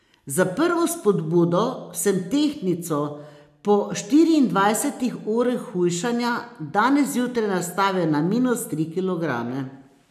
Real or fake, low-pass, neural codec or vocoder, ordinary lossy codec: real; 14.4 kHz; none; none